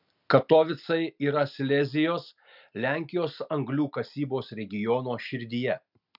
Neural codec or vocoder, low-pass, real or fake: none; 5.4 kHz; real